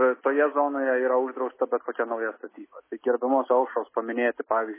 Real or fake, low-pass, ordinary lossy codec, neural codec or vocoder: real; 3.6 kHz; MP3, 16 kbps; none